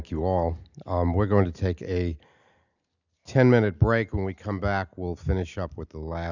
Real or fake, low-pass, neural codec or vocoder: real; 7.2 kHz; none